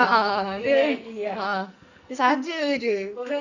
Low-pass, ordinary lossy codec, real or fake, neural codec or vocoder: 7.2 kHz; none; fake; codec, 44.1 kHz, 2.6 kbps, SNAC